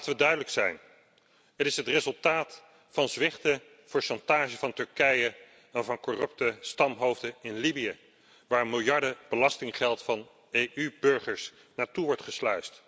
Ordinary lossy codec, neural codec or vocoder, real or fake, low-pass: none; none; real; none